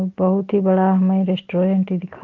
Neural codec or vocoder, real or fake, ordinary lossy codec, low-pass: none; real; Opus, 16 kbps; 7.2 kHz